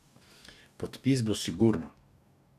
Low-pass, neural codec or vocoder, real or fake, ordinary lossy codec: 14.4 kHz; codec, 44.1 kHz, 2.6 kbps, DAC; fake; none